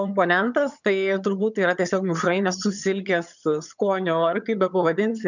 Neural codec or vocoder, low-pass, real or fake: vocoder, 22.05 kHz, 80 mel bands, HiFi-GAN; 7.2 kHz; fake